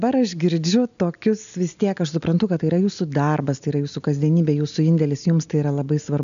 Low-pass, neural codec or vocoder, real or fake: 7.2 kHz; none; real